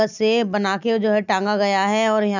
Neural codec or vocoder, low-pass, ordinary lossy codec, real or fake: none; 7.2 kHz; none; real